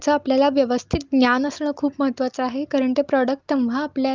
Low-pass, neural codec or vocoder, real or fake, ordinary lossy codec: 7.2 kHz; none; real; Opus, 32 kbps